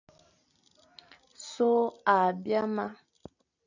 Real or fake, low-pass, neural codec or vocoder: real; 7.2 kHz; none